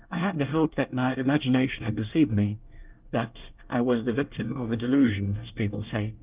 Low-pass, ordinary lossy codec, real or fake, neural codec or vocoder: 3.6 kHz; Opus, 24 kbps; fake; codec, 24 kHz, 1 kbps, SNAC